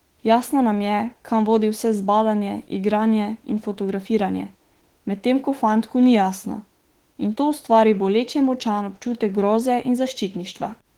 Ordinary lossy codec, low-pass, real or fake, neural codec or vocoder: Opus, 16 kbps; 19.8 kHz; fake; autoencoder, 48 kHz, 32 numbers a frame, DAC-VAE, trained on Japanese speech